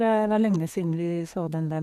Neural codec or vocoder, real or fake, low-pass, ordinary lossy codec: codec, 32 kHz, 1.9 kbps, SNAC; fake; 14.4 kHz; none